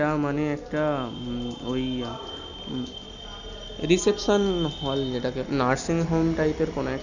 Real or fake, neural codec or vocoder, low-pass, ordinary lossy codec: real; none; 7.2 kHz; none